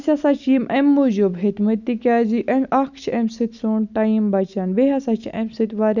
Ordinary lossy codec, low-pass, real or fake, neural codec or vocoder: none; 7.2 kHz; real; none